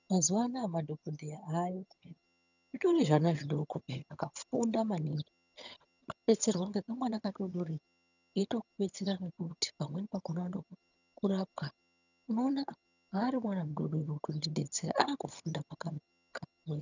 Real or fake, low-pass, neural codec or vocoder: fake; 7.2 kHz; vocoder, 22.05 kHz, 80 mel bands, HiFi-GAN